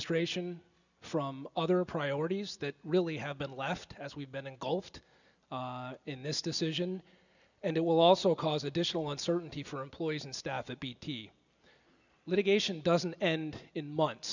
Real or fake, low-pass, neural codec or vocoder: real; 7.2 kHz; none